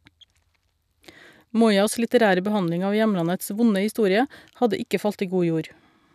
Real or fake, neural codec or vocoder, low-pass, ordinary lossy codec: real; none; 14.4 kHz; none